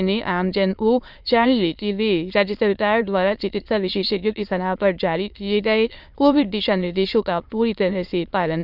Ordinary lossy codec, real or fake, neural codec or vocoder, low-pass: Opus, 64 kbps; fake; autoencoder, 22.05 kHz, a latent of 192 numbers a frame, VITS, trained on many speakers; 5.4 kHz